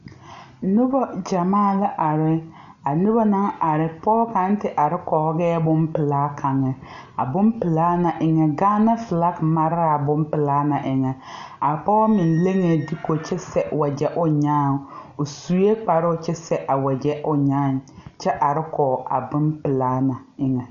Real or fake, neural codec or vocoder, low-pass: real; none; 7.2 kHz